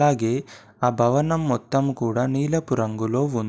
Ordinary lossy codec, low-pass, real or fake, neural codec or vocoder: none; none; real; none